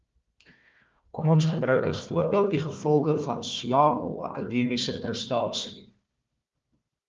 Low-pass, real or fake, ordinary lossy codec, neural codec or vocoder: 7.2 kHz; fake; Opus, 32 kbps; codec, 16 kHz, 1 kbps, FunCodec, trained on Chinese and English, 50 frames a second